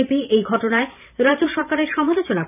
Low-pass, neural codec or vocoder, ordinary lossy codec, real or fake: 3.6 kHz; vocoder, 44.1 kHz, 128 mel bands every 512 samples, BigVGAN v2; none; fake